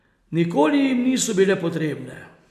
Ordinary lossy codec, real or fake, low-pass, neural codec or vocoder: AAC, 96 kbps; real; 14.4 kHz; none